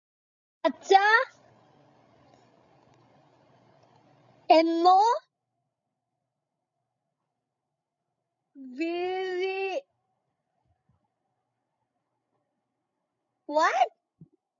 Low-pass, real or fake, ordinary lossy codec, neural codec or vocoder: 7.2 kHz; fake; AAC, 64 kbps; codec, 16 kHz, 16 kbps, FreqCodec, larger model